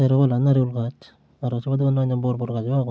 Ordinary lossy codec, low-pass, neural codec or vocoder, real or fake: none; none; none; real